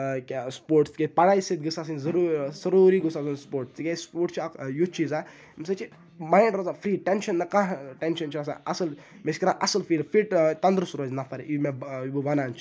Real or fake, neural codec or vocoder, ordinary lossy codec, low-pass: real; none; none; none